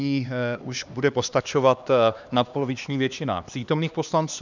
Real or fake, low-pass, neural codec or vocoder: fake; 7.2 kHz; codec, 16 kHz, 2 kbps, X-Codec, HuBERT features, trained on LibriSpeech